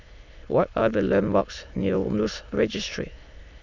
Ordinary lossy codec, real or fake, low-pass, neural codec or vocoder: none; fake; 7.2 kHz; autoencoder, 22.05 kHz, a latent of 192 numbers a frame, VITS, trained on many speakers